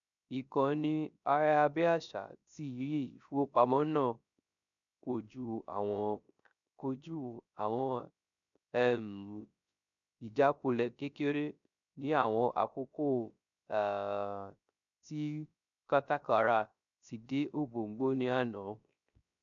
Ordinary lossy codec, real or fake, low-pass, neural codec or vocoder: none; fake; 7.2 kHz; codec, 16 kHz, 0.3 kbps, FocalCodec